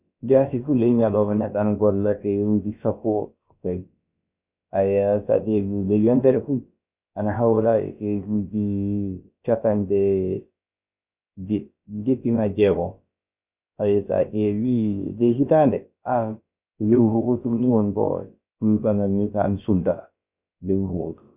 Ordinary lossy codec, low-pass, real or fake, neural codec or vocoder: Opus, 64 kbps; 3.6 kHz; fake; codec, 16 kHz, about 1 kbps, DyCAST, with the encoder's durations